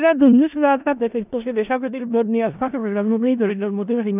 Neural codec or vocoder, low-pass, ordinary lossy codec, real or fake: codec, 16 kHz in and 24 kHz out, 0.4 kbps, LongCat-Audio-Codec, four codebook decoder; 3.6 kHz; none; fake